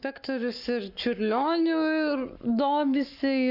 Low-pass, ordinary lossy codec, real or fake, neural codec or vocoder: 5.4 kHz; MP3, 48 kbps; fake; codec, 44.1 kHz, 7.8 kbps, Pupu-Codec